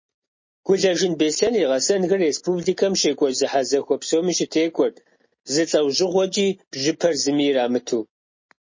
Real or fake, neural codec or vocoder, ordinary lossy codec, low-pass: real; none; MP3, 32 kbps; 7.2 kHz